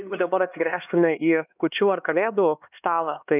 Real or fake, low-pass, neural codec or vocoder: fake; 3.6 kHz; codec, 16 kHz, 2 kbps, X-Codec, HuBERT features, trained on LibriSpeech